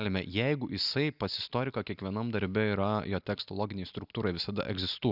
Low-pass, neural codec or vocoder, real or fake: 5.4 kHz; none; real